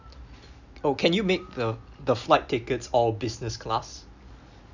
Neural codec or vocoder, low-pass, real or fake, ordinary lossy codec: none; 7.2 kHz; real; none